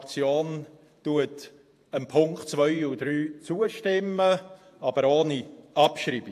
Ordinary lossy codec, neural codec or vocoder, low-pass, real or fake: AAC, 64 kbps; vocoder, 48 kHz, 128 mel bands, Vocos; 14.4 kHz; fake